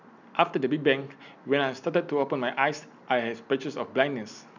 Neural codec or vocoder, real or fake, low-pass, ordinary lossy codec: none; real; 7.2 kHz; none